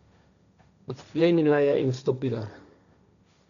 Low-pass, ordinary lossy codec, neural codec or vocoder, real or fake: 7.2 kHz; none; codec, 16 kHz, 1.1 kbps, Voila-Tokenizer; fake